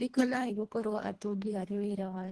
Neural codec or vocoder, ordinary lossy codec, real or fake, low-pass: codec, 24 kHz, 1.5 kbps, HILCodec; Opus, 16 kbps; fake; 10.8 kHz